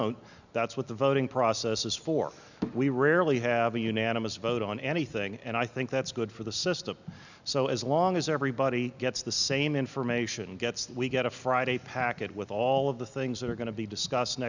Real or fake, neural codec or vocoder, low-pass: real; none; 7.2 kHz